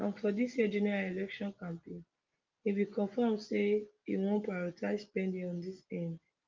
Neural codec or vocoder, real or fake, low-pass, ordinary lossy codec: none; real; 7.2 kHz; Opus, 32 kbps